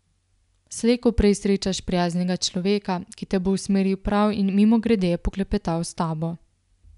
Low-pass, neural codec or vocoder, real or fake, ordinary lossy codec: 10.8 kHz; none; real; none